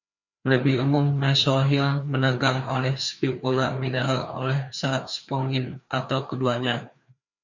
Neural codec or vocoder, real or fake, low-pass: codec, 16 kHz, 2 kbps, FreqCodec, larger model; fake; 7.2 kHz